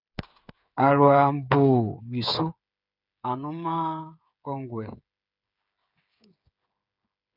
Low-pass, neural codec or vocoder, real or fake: 5.4 kHz; codec, 16 kHz, 8 kbps, FreqCodec, smaller model; fake